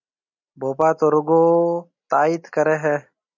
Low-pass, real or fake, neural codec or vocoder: 7.2 kHz; real; none